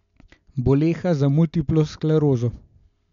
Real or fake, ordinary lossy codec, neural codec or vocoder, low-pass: real; none; none; 7.2 kHz